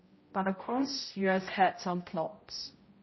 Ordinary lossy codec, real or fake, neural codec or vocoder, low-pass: MP3, 24 kbps; fake; codec, 16 kHz, 0.5 kbps, X-Codec, HuBERT features, trained on general audio; 7.2 kHz